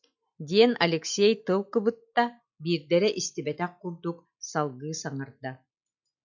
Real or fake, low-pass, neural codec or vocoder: real; 7.2 kHz; none